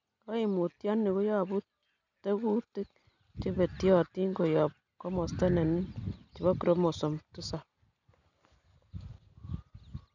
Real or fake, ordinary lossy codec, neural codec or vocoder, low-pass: real; none; none; 7.2 kHz